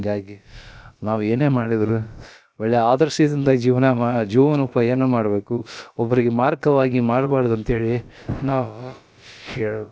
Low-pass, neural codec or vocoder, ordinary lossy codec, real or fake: none; codec, 16 kHz, about 1 kbps, DyCAST, with the encoder's durations; none; fake